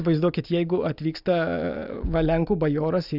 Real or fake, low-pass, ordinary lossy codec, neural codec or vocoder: real; 5.4 kHz; Opus, 64 kbps; none